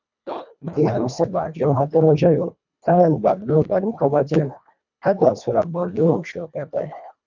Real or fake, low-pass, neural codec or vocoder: fake; 7.2 kHz; codec, 24 kHz, 1.5 kbps, HILCodec